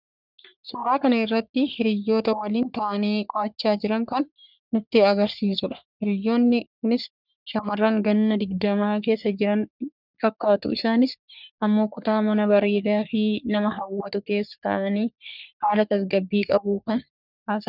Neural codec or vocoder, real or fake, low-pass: codec, 44.1 kHz, 3.4 kbps, Pupu-Codec; fake; 5.4 kHz